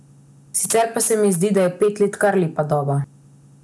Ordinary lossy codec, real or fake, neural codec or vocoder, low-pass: none; real; none; none